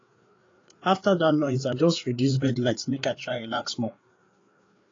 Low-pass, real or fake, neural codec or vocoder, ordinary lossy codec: 7.2 kHz; fake; codec, 16 kHz, 4 kbps, FreqCodec, larger model; AAC, 32 kbps